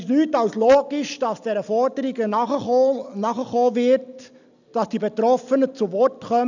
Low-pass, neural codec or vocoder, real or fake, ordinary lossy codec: 7.2 kHz; none; real; none